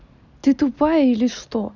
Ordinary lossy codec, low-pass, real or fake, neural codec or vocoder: none; 7.2 kHz; real; none